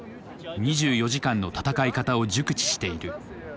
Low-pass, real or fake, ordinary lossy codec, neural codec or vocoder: none; real; none; none